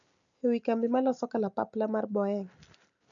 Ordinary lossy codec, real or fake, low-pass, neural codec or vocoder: none; real; 7.2 kHz; none